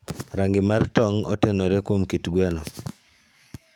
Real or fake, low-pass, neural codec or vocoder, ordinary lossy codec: fake; 19.8 kHz; codec, 44.1 kHz, 7.8 kbps, DAC; none